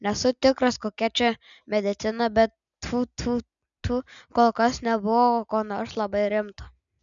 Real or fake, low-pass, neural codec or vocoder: real; 7.2 kHz; none